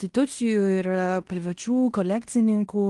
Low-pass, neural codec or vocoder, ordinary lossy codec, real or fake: 10.8 kHz; codec, 16 kHz in and 24 kHz out, 0.9 kbps, LongCat-Audio-Codec, fine tuned four codebook decoder; Opus, 24 kbps; fake